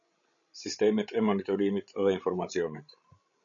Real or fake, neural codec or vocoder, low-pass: fake; codec, 16 kHz, 16 kbps, FreqCodec, larger model; 7.2 kHz